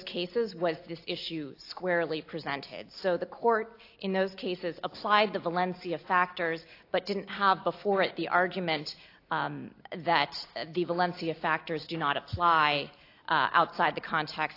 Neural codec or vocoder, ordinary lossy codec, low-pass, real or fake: none; AAC, 32 kbps; 5.4 kHz; real